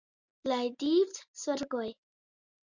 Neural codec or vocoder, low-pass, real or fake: none; 7.2 kHz; real